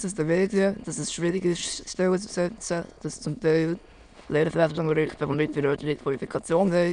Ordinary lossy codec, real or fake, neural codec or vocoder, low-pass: none; fake; autoencoder, 22.05 kHz, a latent of 192 numbers a frame, VITS, trained on many speakers; 9.9 kHz